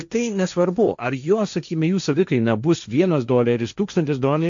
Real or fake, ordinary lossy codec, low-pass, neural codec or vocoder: fake; MP3, 64 kbps; 7.2 kHz; codec, 16 kHz, 1.1 kbps, Voila-Tokenizer